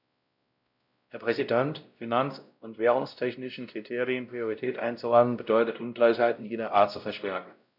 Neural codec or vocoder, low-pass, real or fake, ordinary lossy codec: codec, 16 kHz, 0.5 kbps, X-Codec, WavLM features, trained on Multilingual LibriSpeech; 5.4 kHz; fake; none